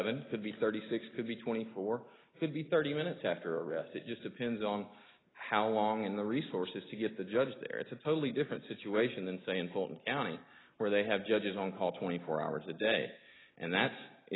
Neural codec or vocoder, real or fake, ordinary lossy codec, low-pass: none; real; AAC, 16 kbps; 7.2 kHz